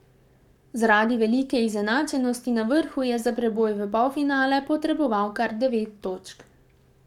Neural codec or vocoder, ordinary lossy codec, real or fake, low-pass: codec, 44.1 kHz, 7.8 kbps, Pupu-Codec; none; fake; 19.8 kHz